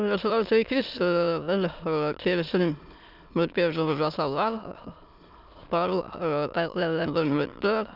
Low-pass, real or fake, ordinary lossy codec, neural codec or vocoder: 5.4 kHz; fake; none; autoencoder, 22.05 kHz, a latent of 192 numbers a frame, VITS, trained on many speakers